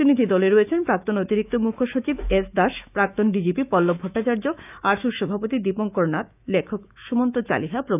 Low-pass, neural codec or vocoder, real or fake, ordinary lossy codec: 3.6 kHz; autoencoder, 48 kHz, 128 numbers a frame, DAC-VAE, trained on Japanese speech; fake; none